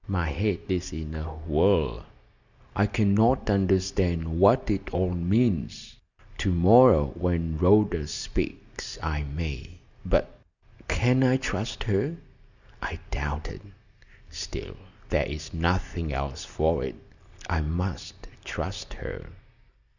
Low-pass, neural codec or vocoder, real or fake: 7.2 kHz; none; real